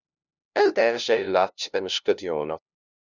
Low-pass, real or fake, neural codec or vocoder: 7.2 kHz; fake; codec, 16 kHz, 0.5 kbps, FunCodec, trained on LibriTTS, 25 frames a second